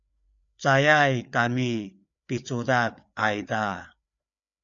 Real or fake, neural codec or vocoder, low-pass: fake; codec, 16 kHz, 4 kbps, FreqCodec, larger model; 7.2 kHz